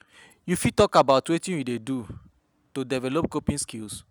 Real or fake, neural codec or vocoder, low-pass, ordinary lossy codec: real; none; none; none